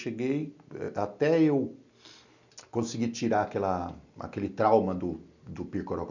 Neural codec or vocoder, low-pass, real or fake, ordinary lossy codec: none; 7.2 kHz; real; none